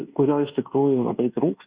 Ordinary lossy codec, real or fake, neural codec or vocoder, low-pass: Opus, 64 kbps; fake; codec, 24 kHz, 1.2 kbps, DualCodec; 3.6 kHz